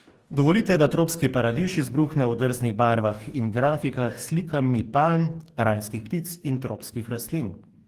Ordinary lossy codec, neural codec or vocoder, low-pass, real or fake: Opus, 24 kbps; codec, 44.1 kHz, 2.6 kbps, DAC; 14.4 kHz; fake